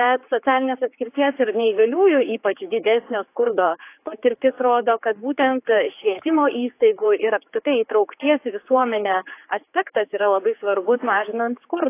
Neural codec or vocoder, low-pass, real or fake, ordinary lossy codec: codec, 16 kHz, 4 kbps, X-Codec, HuBERT features, trained on general audio; 3.6 kHz; fake; AAC, 24 kbps